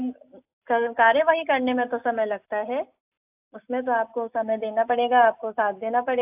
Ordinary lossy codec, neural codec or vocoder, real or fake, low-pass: AAC, 32 kbps; none; real; 3.6 kHz